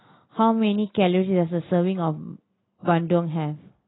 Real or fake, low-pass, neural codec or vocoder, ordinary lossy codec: real; 7.2 kHz; none; AAC, 16 kbps